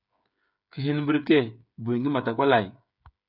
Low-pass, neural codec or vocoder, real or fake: 5.4 kHz; codec, 16 kHz, 8 kbps, FreqCodec, smaller model; fake